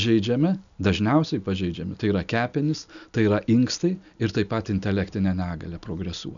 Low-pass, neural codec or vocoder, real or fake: 7.2 kHz; none; real